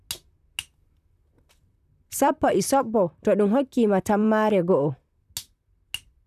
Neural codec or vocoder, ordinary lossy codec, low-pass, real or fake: vocoder, 44.1 kHz, 128 mel bands, Pupu-Vocoder; none; 14.4 kHz; fake